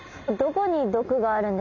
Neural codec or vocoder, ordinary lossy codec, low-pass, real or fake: none; none; 7.2 kHz; real